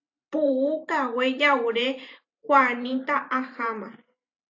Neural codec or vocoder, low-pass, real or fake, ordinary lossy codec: vocoder, 44.1 kHz, 128 mel bands every 512 samples, BigVGAN v2; 7.2 kHz; fake; AAC, 48 kbps